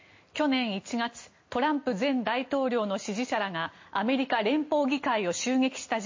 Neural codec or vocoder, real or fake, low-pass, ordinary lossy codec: none; real; 7.2 kHz; MP3, 32 kbps